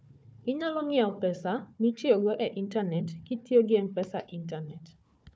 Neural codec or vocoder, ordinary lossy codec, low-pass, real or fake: codec, 16 kHz, 4 kbps, FunCodec, trained on Chinese and English, 50 frames a second; none; none; fake